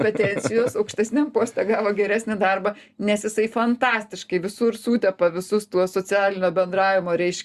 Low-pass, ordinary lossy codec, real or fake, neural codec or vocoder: 14.4 kHz; Opus, 64 kbps; real; none